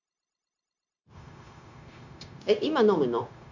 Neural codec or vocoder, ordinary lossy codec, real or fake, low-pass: codec, 16 kHz, 0.9 kbps, LongCat-Audio-Codec; none; fake; 7.2 kHz